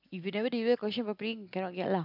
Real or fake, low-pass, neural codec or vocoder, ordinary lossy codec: real; 5.4 kHz; none; none